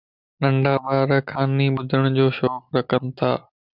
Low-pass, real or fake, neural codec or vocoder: 5.4 kHz; real; none